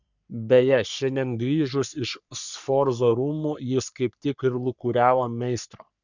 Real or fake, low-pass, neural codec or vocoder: fake; 7.2 kHz; codec, 44.1 kHz, 3.4 kbps, Pupu-Codec